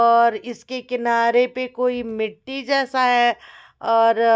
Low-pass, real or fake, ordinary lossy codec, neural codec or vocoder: none; real; none; none